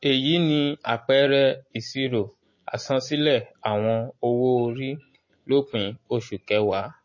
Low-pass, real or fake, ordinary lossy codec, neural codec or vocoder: 7.2 kHz; real; MP3, 32 kbps; none